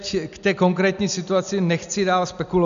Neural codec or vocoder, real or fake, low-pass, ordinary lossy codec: none; real; 7.2 kHz; MP3, 96 kbps